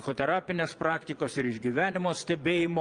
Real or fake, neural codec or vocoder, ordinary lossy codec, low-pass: fake; vocoder, 22.05 kHz, 80 mel bands, Vocos; Opus, 32 kbps; 9.9 kHz